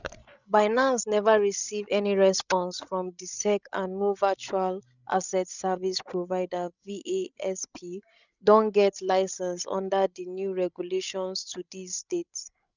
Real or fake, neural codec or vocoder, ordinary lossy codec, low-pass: fake; codec, 16 kHz, 16 kbps, FreqCodec, larger model; none; 7.2 kHz